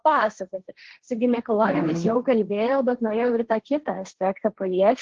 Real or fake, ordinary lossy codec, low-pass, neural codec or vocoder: fake; Opus, 16 kbps; 7.2 kHz; codec, 16 kHz, 1.1 kbps, Voila-Tokenizer